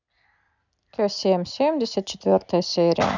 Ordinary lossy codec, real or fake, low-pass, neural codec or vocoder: none; real; 7.2 kHz; none